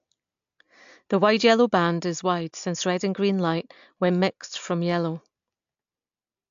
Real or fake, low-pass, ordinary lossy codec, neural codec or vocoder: real; 7.2 kHz; AAC, 64 kbps; none